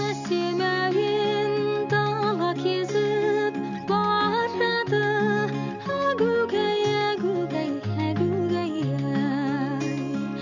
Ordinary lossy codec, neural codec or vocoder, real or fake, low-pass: none; none; real; 7.2 kHz